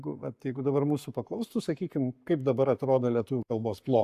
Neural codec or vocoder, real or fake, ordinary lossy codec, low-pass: codec, 44.1 kHz, 7.8 kbps, Pupu-Codec; fake; AAC, 96 kbps; 14.4 kHz